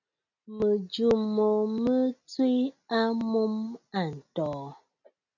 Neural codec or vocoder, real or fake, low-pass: none; real; 7.2 kHz